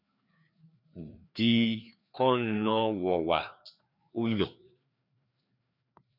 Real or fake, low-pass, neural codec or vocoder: fake; 5.4 kHz; codec, 16 kHz, 2 kbps, FreqCodec, larger model